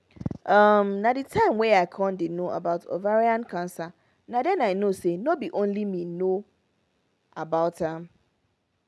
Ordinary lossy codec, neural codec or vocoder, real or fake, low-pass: none; none; real; none